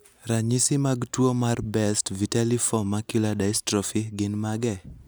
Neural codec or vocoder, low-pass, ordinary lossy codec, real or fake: none; none; none; real